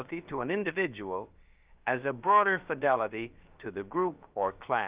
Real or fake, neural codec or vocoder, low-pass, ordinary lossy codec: fake; codec, 16 kHz, about 1 kbps, DyCAST, with the encoder's durations; 3.6 kHz; Opus, 32 kbps